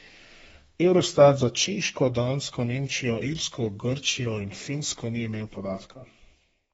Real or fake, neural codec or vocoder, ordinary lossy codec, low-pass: fake; codec, 32 kHz, 1.9 kbps, SNAC; AAC, 24 kbps; 14.4 kHz